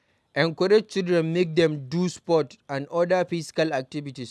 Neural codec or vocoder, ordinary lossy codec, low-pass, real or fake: none; none; none; real